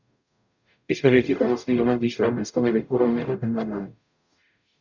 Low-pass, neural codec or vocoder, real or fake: 7.2 kHz; codec, 44.1 kHz, 0.9 kbps, DAC; fake